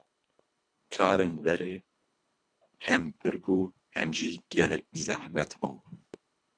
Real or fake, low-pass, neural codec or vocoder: fake; 9.9 kHz; codec, 24 kHz, 1.5 kbps, HILCodec